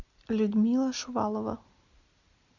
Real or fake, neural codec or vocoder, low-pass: real; none; 7.2 kHz